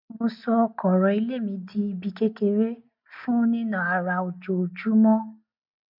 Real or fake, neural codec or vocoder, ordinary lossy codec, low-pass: real; none; MP3, 48 kbps; 5.4 kHz